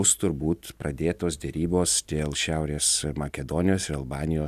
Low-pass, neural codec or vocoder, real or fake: 14.4 kHz; none; real